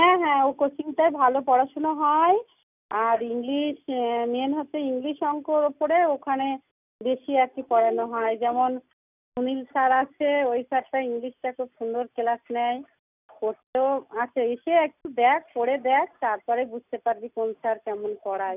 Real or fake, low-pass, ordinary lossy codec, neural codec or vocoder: real; 3.6 kHz; none; none